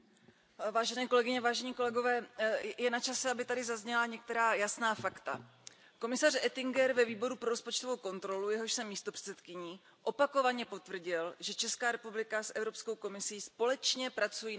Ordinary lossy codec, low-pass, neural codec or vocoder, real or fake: none; none; none; real